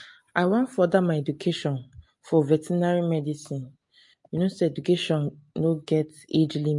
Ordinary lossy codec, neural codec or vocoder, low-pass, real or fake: MP3, 48 kbps; none; 10.8 kHz; real